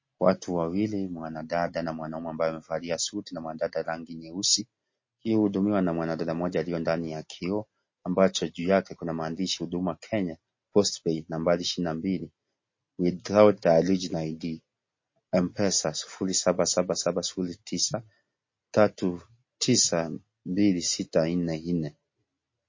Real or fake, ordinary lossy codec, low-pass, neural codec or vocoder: real; MP3, 32 kbps; 7.2 kHz; none